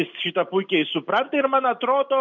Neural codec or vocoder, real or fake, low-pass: none; real; 7.2 kHz